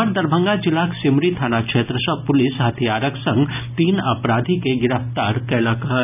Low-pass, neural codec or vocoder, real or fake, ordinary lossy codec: 3.6 kHz; none; real; none